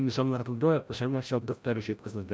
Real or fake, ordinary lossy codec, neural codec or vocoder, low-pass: fake; none; codec, 16 kHz, 0.5 kbps, FreqCodec, larger model; none